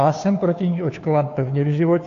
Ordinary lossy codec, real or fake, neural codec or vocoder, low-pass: AAC, 48 kbps; fake; codec, 16 kHz, 2 kbps, FunCodec, trained on Chinese and English, 25 frames a second; 7.2 kHz